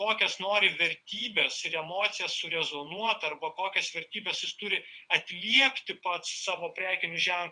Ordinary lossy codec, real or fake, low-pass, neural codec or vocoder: Opus, 64 kbps; fake; 9.9 kHz; vocoder, 22.05 kHz, 80 mel bands, WaveNeXt